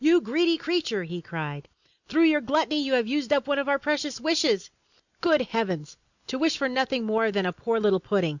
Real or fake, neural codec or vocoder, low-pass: real; none; 7.2 kHz